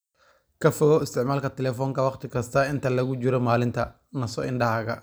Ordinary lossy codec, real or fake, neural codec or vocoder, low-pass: none; real; none; none